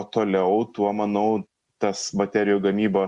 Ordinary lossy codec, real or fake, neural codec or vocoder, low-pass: Opus, 64 kbps; real; none; 10.8 kHz